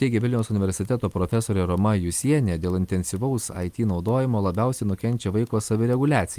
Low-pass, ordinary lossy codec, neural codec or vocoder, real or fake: 14.4 kHz; Opus, 32 kbps; none; real